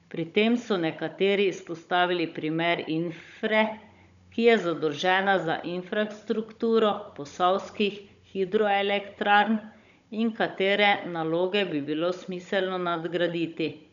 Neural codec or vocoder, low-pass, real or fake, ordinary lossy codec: codec, 16 kHz, 16 kbps, FunCodec, trained on Chinese and English, 50 frames a second; 7.2 kHz; fake; none